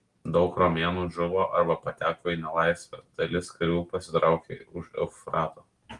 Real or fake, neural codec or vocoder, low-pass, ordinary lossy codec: real; none; 10.8 kHz; Opus, 24 kbps